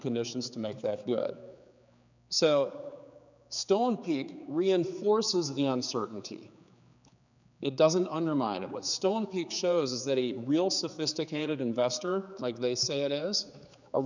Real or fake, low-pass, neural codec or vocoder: fake; 7.2 kHz; codec, 16 kHz, 4 kbps, X-Codec, HuBERT features, trained on general audio